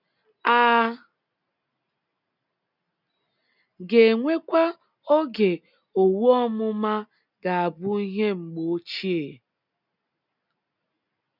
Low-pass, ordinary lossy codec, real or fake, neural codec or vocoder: 5.4 kHz; none; real; none